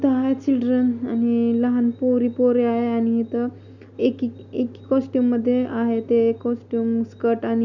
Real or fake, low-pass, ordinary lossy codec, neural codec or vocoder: real; 7.2 kHz; none; none